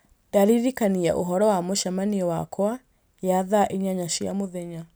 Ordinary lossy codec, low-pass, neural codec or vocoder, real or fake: none; none; none; real